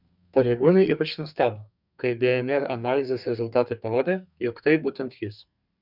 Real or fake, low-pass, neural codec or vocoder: fake; 5.4 kHz; codec, 32 kHz, 1.9 kbps, SNAC